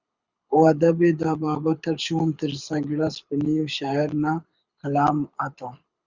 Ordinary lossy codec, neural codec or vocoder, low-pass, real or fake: Opus, 64 kbps; codec, 24 kHz, 6 kbps, HILCodec; 7.2 kHz; fake